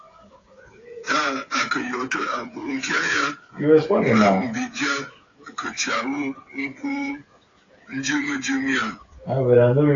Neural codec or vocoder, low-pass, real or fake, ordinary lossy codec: codec, 16 kHz, 8 kbps, FreqCodec, smaller model; 7.2 kHz; fake; AAC, 32 kbps